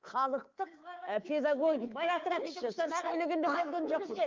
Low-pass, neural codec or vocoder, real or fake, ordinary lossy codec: 7.2 kHz; codec, 24 kHz, 3.1 kbps, DualCodec; fake; Opus, 24 kbps